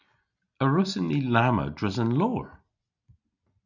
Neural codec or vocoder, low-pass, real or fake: none; 7.2 kHz; real